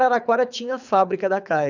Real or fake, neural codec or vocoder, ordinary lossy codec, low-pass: fake; codec, 24 kHz, 6 kbps, HILCodec; none; 7.2 kHz